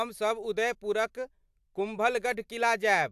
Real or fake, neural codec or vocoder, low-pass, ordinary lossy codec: real; none; 14.4 kHz; AAC, 96 kbps